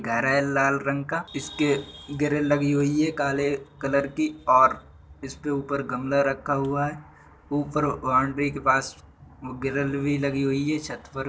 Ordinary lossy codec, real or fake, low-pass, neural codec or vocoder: none; real; none; none